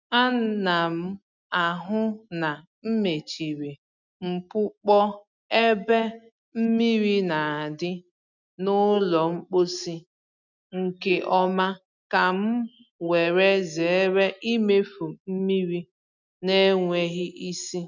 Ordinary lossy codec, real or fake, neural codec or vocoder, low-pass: none; real; none; 7.2 kHz